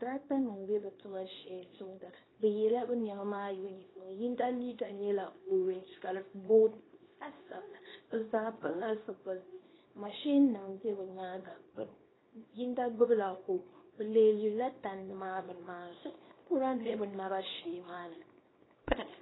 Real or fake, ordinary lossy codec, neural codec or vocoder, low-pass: fake; AAC, 16 kbps; codec, 24 kHz, 0.9 kbps, WavTokenizer, small release; 7.2 kHz